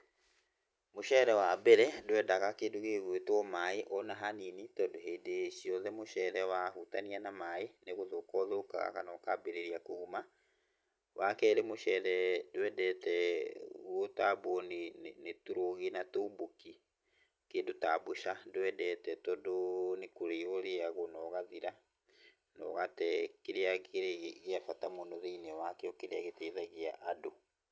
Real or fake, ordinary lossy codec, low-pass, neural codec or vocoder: real; none; none; none